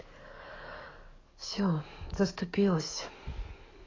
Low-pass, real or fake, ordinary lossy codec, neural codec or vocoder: 7.2 kHz; fake; AAC, 32 kbps; codec, 44.1 kHz, 7.8 kbps, DAC